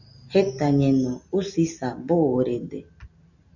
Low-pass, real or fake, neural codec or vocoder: 7.2 kHz; real; none